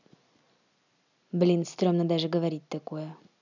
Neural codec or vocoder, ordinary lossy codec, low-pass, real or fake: none; none; 7.2 kHz; real